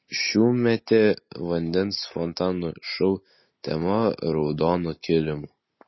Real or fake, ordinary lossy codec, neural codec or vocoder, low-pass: real; MP3, 24 kbps; none; 7.2 kHz